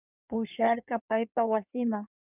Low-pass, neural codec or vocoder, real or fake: 3.6 kHz; codec, 16 kHz in and 24 kHz out, 1.1 kbps, FireRedTTS-2 codec; fake